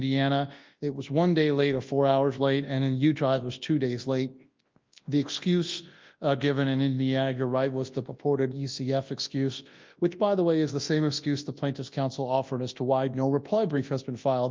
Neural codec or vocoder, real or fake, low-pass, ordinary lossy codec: codec, 24 kHz, 0.9 kbps, WavTokenizer, large speech release; fake; 7.2 kHz; Opus, 32 kbps